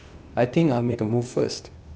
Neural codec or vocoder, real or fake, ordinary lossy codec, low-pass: codec, 16 kHz, 0.8 kbps, ZipCodec; fake; none; none